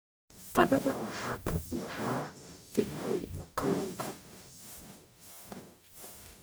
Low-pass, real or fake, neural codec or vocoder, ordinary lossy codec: none; fake; codec, 44.1 kHz, 0.9 kbps, DAC; none